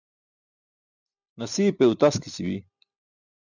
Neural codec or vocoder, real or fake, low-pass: none; real; 7.2 kHz